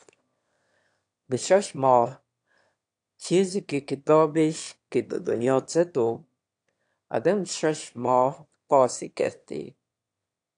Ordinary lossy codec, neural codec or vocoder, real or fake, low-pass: AAC, 64 kbps; autoencoder, 22.05 kHz, a latent of 192 numbers a frame, VITS, trained on one speaker; fake; 9.9 kHz